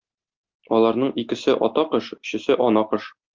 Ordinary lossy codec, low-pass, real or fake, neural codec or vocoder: Opus, 32 kbps; 7.2 kHz; real; none